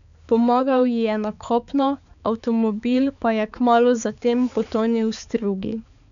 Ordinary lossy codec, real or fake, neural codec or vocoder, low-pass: none; fake; codec, 16 kHz, 4 kbps, X-Codec, HuBERT features, trained on balanced general audio; 7.2 kHz